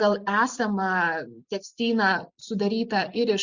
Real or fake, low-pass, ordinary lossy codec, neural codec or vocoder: fake; 7.2 kHz; Opus, 64 kbps; vocoder, 44.1 kHz, 128 mel bands every 256 samples, BigVGAN v2